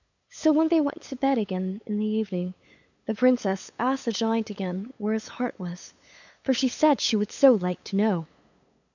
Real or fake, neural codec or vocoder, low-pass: fake; codec, 16 kHz, 8 kbps, FunCodec, trained on LibriTTS, 25 frames a second; 7.2 kHz